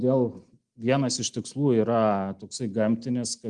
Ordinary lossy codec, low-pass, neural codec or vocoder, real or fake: Opus, 24 kbps; 10.8 kHz; none; real